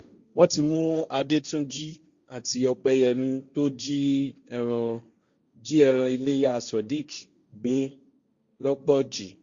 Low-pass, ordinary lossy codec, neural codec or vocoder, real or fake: 7.2 kHz; Opus, 64 kbps; codec, 16 kHz, 1.1 kbps, Voila-Tokenizer; fake